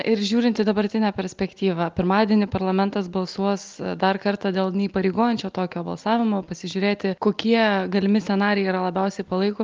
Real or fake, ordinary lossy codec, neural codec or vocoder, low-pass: real; Opus, 32 kbps; none; 7.2 kHz